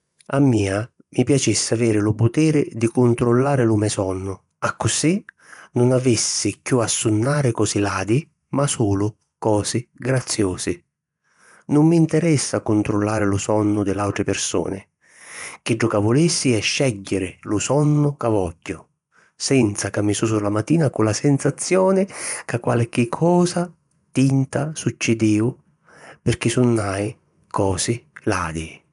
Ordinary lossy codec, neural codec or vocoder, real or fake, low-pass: none; vocoder, 24 kHz, 100 mel bands, Vocos; fake; 10.8 kHz